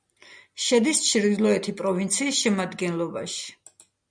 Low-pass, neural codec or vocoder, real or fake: 9.9 kHz; none; real